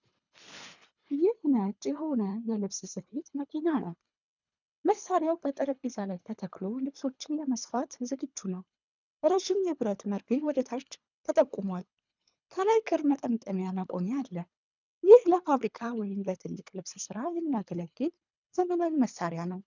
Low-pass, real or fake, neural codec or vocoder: 7.2 kHz; fake; codec, 24 kHz, 3 kbps, HILCodec